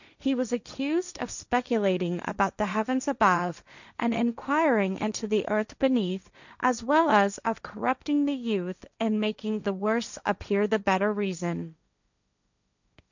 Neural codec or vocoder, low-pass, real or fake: codec, 16 kHz, 1.1 kbps, Voila-Tokenizer; 7.2 kHz; fake